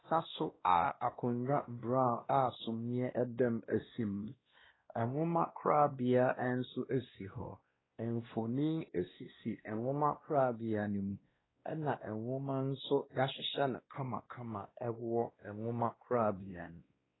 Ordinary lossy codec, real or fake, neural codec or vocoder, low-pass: AAC, 16 kbps; fake; codec, 16 kHz, 1 kbps, X-Codec, WavLM features, trained on Multilingual LibriSpeech; 7.2 kHz